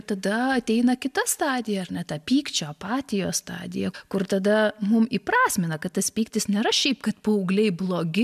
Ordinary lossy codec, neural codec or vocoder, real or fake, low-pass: MP3, 96 kbps; none; real; 14.4 kHz